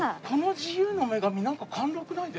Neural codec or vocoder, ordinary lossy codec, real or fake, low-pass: none; none; real; none